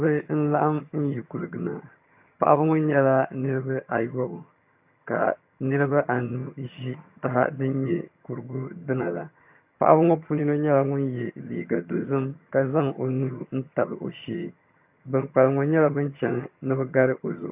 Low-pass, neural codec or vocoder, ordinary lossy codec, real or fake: 3.6 kHz; vocoder, 22.05 kHz, 80 mel bands, HiFi-GAN; AAC, 32 kbps; fake